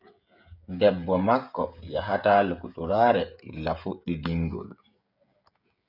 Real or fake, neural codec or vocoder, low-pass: fake; codec, 16 kHz, 16 kbps, FreqCodec, smaller model; 5.4 kHz